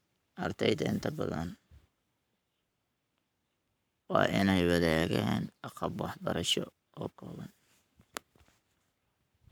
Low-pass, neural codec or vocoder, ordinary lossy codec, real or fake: none; codec, 44.1 kHz, 7.8 kbps, Pupu-Codec; none; fake